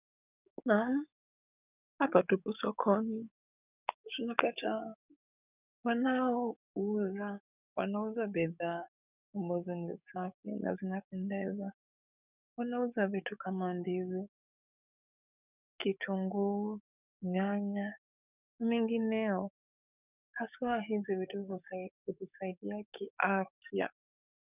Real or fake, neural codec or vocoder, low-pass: fake; codec, 44.1 kHz, 7.8 kbps, DAC; 3.6 kHz